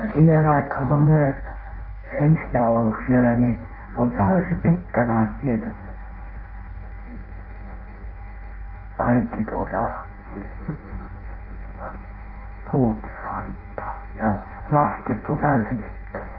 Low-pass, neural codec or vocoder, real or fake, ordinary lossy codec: 5.4 kHz; codec, 16 kHz in and 24 kHz out, 0.6 kbps, FireRedTTS-2 codec; fake; AAC, 32 kbps